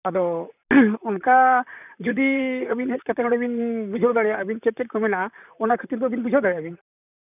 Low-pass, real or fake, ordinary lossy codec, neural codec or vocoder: 3.6 kHz; fake; none; vocoder, 44.1 kHz, 128 mel bands, Pupu-Vocoder